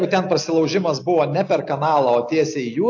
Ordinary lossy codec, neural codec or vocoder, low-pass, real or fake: AAC, 48 kbps; none; 7.2 kHz; real